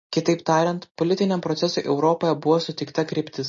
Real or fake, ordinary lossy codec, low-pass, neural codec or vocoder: real; MP3, 32 kbps; 7.2 kHz; none